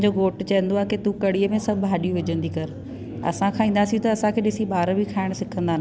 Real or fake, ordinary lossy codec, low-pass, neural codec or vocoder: real; none; none; none